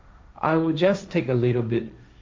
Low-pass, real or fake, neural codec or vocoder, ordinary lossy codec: none; fake; codec, 16 kHz, 1.1 kbps, Voila-Tokenizer; none